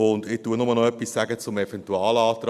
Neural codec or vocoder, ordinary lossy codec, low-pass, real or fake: none; AAC, 96 kbps; 14.4 kHz; real